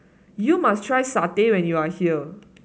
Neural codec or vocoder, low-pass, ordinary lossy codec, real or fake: none; none; none; real